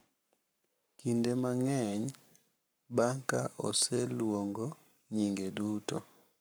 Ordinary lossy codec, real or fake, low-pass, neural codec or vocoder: none; fake; none; codec, 44.1 kHz, 7.8 kbps, Pupu-Codec